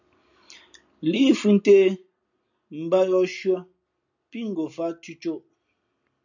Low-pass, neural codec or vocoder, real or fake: 7.2 kHz; none; real